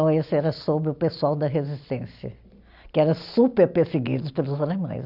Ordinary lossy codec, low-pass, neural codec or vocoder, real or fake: none; 5.4 kHz; none; real